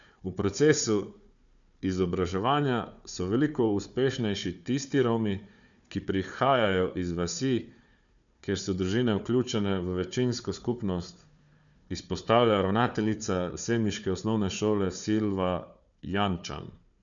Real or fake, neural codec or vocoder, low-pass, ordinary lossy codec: fake; codec, 16 kHz, 4 kbps, FunCodec, trained on Chinese and English, 50 frames a second; 7.2 kHz; none